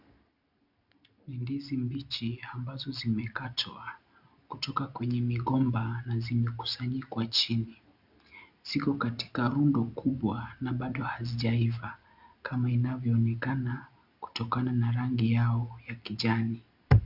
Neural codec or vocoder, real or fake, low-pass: none; real; 5.4 kHz